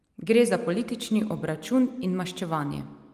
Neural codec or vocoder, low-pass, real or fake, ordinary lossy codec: none; 14.4 kHz; real; Opus, 32 kbps